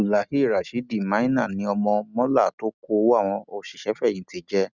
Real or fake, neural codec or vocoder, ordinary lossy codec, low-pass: real; none; none; 7.2 kHz